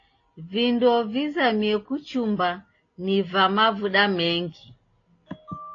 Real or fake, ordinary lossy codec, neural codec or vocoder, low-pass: real; AAC, 32 kbps; none; 7.2 kHz